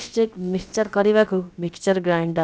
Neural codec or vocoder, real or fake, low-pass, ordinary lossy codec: codec, 16 kHz, about 1 kbps, DyCAST, with the encoder's durations; fake; none; none